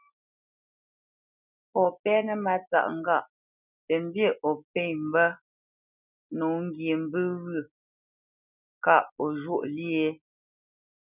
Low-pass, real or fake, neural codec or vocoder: 3.6 kHz; real; none